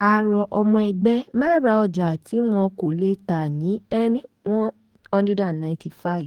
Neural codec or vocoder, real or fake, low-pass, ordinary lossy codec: codec, 44.1 kHz, 2.6 kbps, DAC; fake; 19.8 kHz; Opus, 32 kbps